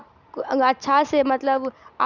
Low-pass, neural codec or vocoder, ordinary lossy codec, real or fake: 7.2 kHz; none; none; real